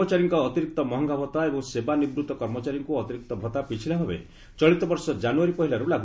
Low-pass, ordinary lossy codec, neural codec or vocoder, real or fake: none; none; none; real